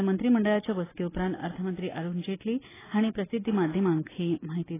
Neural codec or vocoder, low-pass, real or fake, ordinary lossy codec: none; 3.6 kHz; real; AAC, 16 kbps